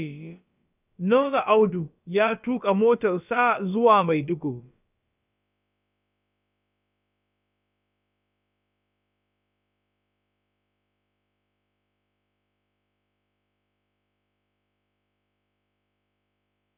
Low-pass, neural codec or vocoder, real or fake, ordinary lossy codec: 3.6 kHz; codec, 16 kHz, about 1 kbps, DyCAST, with the encoder's durations; fake; none